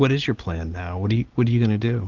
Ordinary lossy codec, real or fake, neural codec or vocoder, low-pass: Opus, 16 kbps; real; none; 7.2 kHz